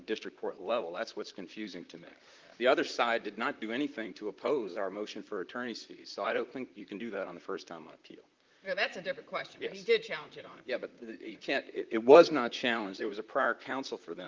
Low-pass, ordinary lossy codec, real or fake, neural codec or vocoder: 7.2 kHz; Opus, 24 kbps; fake; vocoder, 44.1 kHz, 128 mel bands, Pupu-Vocoder